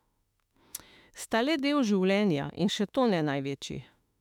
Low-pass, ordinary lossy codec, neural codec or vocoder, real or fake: 19.8 kHz; none; autoencoder, 48 kHz, 32 numbers a frame, DAC-VAE, trained on Japanese speech; fake